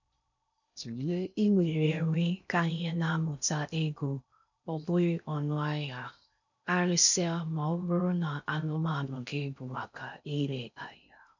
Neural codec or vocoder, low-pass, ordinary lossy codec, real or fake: codec, 16 kHz in and 24 kHz out, 0.6 kbps, FocalCodec, streaming, 4096 codes; 7.2 kHz; none; fake